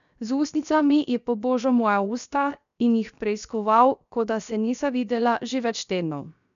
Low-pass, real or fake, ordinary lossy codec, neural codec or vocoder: 7.2 kHz; fake; none; codec, 16 kHz, 0.7 kbps, FocalCodec